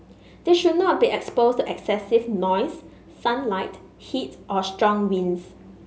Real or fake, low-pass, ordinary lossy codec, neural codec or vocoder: real; none; none; none